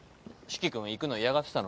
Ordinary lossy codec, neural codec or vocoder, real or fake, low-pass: none; none; real; none